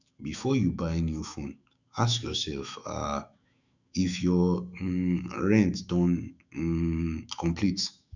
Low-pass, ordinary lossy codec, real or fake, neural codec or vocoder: 7.2 kHz; none; fake; autoencoder, 48 kHz, 128 numbers a frame, DAC-VAE, trained on Japanese speech